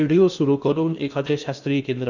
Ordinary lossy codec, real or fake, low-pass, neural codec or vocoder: none; fake; 7.2 kHz; codec, 16 kHz in and 24 kHz out, 0.8 kbps, FocalCodec, streaming, 65536 codes